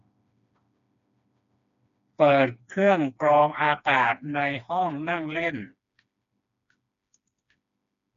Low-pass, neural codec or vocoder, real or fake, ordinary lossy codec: 7.2 kHz; codec, 16 kHz, 2 kbps, FreqCodec, smaller model; fake; none